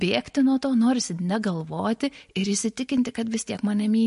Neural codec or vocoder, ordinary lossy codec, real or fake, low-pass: none; MP3, 48 kbps; real; 14.4 kHz